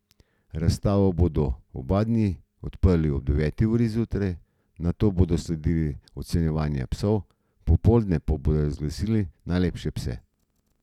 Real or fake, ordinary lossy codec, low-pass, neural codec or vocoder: real; none; 19.8 kHz; none